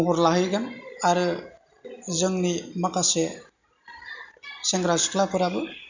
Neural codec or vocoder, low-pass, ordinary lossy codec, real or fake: none; 7.2 kHz; none; real